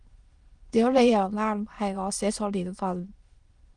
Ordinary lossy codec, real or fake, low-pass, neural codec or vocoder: Opus, 24 kbps; fake; 9.9 kHz; autoencoder, 22.05 kHz, a latent of 192 numbers a frame, VITS, trained on many speakers